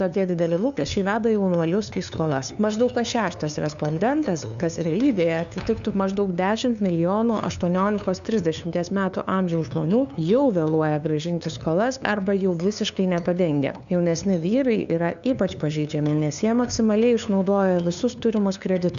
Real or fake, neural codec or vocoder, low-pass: fake; codec, 16 kHz, 2 kbps, FunCodec, trained on LibriTTS, 25 frames a second; 7.2 kHz